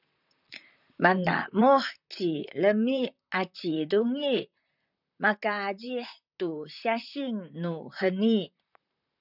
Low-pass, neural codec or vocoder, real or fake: 5.4 kHz; vocoder, 44.1 kHz, 128 mel bands, Pupu-Vocoder; fake